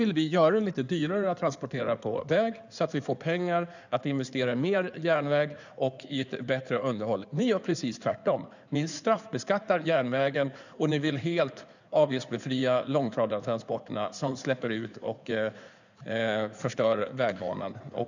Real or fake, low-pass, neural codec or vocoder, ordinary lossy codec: fake; 7.2 kHz; codec, 16 kHz in and 24 kHz out, 2.2 kbps, FireRedTTS-2 codec; none